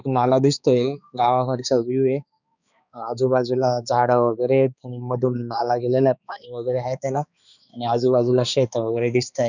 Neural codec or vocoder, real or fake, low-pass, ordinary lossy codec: codec, 16 kHz, 2 kbps, X-Codec, HuBERT features, trained on balanced general audio; fake; 7.2 kHz; none